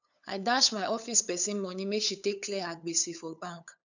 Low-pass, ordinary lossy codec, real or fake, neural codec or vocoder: 7.2 kHz; none; fake; codec, 16 kHz, 8 kbps, FunCodec, trained on LibriTTS, 25 frames a second